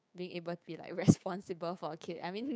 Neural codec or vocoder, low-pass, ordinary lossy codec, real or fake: codec, 16 kHz, 6 kbps, DAC; none; none; fake